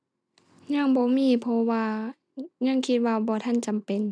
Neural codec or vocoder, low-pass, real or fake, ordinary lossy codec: none; 9.9 kHz; real; none